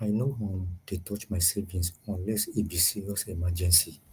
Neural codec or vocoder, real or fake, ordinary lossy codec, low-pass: none; real; Opus, 32 kbps; 14.4 kHz